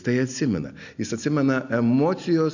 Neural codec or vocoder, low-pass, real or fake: none; 7.2 kHz; real